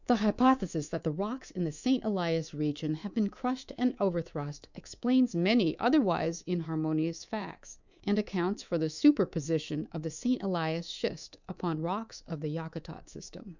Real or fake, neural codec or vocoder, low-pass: fake; codec, 24 kHz, 3.1 kbps, DualCodec; 7.2 kHz